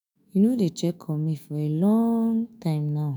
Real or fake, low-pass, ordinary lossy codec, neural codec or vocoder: fake; none; none; autoencoder, 48 kHz, 128 numbers a frame, DAC-VAE, trained on Japanese speech